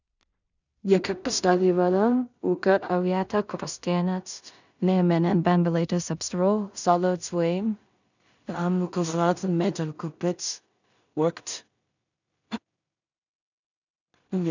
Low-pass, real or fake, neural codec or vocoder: 7.2 kHz; fake; codec, 16 kHz in and 24 kHz out, 0.4 kbps, LongCat-Audio-Codec, two codebook decoder